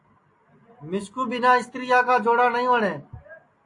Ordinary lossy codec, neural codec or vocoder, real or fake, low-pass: AAC, 48 kbps; none; real; 10.8 kHz